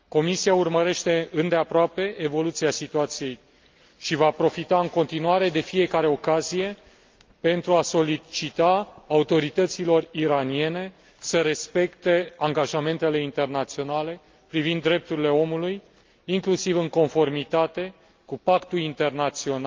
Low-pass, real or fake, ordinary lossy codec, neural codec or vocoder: 7.2 kHz; real; Opus, 24 kbps; none